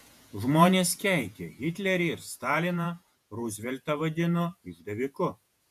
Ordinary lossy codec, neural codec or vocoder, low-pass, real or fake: MP3, 96 kbps; vocoder, 48 kHz, 128 mel bands, Vocos; 14.4 kHz; fake